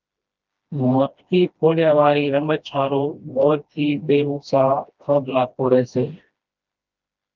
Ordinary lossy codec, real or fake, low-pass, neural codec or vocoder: Opus, 24 kbps; fake; 7.2 kHz; codec, 16 kHz, 1 kbps, FreqCodec, smaller model